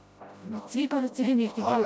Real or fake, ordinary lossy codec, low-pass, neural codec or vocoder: fake; none; none; codec, 16 kHz, 0.5 kbps, FreqCodec, smaller model